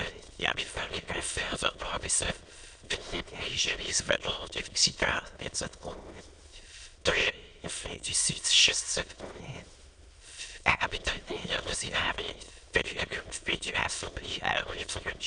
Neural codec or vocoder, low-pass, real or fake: autoencoder, 22.05 kHz, a latent of 192 numbers a frame, VITS, trained on many speakers; 9.9 kHz; fake